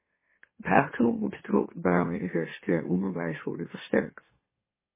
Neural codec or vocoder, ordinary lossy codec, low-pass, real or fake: autoencoder, 44.1 kHz, a latent of 192 numbers a frame, MeloTTS; MP3, 16 kbps; 3.6 kHz; fake